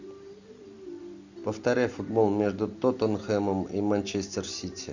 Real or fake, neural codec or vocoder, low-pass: real; none; 7.2 kHz